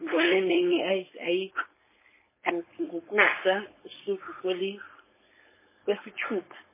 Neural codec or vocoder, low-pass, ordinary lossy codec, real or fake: codec, 16 kHz, 4.8 kbps, FACodec; 3.6 kHz; MP3, 16 kbps; fake